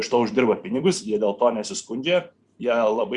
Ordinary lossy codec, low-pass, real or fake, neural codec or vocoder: Opus, 64 kbps; 10.8 kHz; fake; vocoder, 44.1 kHz, 128 mel bands every 256 samples, BigVGAN v2